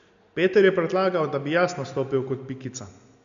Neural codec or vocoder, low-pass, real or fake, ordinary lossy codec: none; 7.2 kHz; real; MP3, 64 kbps